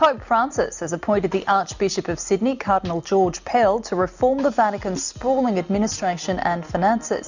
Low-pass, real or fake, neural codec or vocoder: 7.2 kHz; real; none